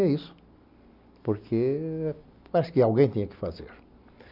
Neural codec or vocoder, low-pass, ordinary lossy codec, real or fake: none; 5.4 kHz; none; real